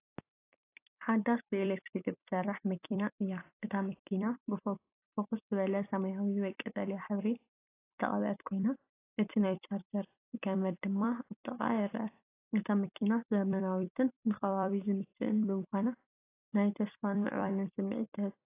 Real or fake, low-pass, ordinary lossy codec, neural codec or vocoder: fake; 3.6 kHz; AAC, 24 kbps; vocoder, 44.1 kHz, 128 mel bands every 256 samples, BigVGAN v2